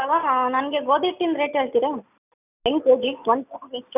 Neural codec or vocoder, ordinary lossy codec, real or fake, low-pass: none; none; real; 3.6 kHz